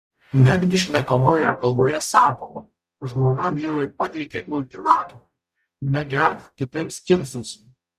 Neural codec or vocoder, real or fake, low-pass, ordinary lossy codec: codec, 44.1 kHz, 0.9 kbps, DAC; fake; 14.4 kHz; Opus, 64 kbps